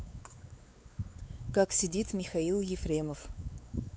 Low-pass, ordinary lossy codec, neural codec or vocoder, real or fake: none; none; codec, 16 kHz, 4 kbps, X-Codec, WavLM features, trained on Multilingual LibriSpeech; fake